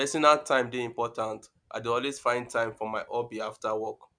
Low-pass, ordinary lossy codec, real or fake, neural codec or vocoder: 9.9 kHz; none; real; none